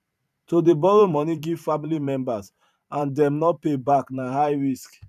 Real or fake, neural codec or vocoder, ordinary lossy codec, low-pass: fake; vocoder, 44.1 kHz, 128 mel bands every 512 samples, BigVGAN v2; none; 14.4 kHz